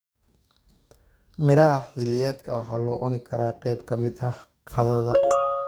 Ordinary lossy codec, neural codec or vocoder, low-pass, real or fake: none; codec, 44.1 kHz, 2.6 kbps, DAC; none; fake